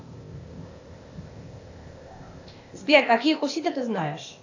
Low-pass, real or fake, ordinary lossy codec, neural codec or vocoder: 7.2 kHz; fake; AAC, 48 kbps; codec, 16 kHz, 0.8 kbps, ZipCodec